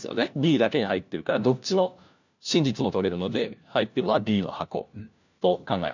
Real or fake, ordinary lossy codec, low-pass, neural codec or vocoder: fake; none; 7.2 kHz; codec, 16 kHz, 1 kbps, FunCodec, trained on LibriTTS, 50 frames a second